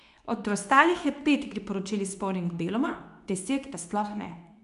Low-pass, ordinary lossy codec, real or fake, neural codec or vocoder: 10.8 kHz; none; fake; codec, 24 kHz, 0.9 kbps, WavTokenizer, medium speech release version 2